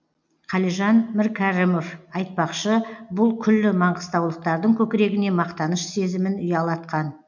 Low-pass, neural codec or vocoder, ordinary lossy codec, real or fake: 7.2 kHz; none; none; real